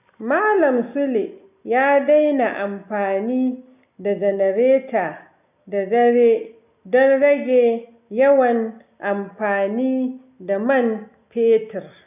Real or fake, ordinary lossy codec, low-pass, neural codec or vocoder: real; none; 3.6 kHz; none